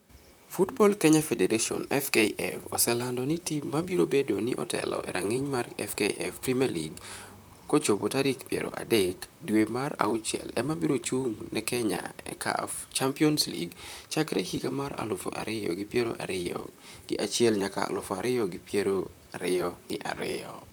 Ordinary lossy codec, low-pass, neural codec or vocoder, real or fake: none; none; vocoder, 44.1 kHz, 128 mel bands, Pupu-Vocoder; fake